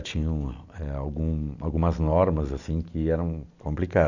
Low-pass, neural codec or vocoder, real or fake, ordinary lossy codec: 7.2 kHz; none; real; none